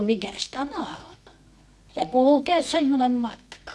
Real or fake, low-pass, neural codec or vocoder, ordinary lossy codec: fake; none; codec, 24 kHz, 0.9 kbps, WavTokenizer, medium music audio release; none